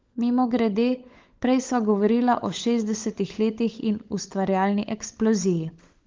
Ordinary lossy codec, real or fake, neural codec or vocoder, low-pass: Opus, 32 kbps; fake; codec, 16 kHz, 16 kbps, FunCodec, trained on LibriTTS, 50 frames a second; 7.2 kHz